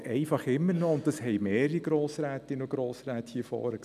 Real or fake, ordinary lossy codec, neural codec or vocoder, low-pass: real; none; none; 14.4 kHz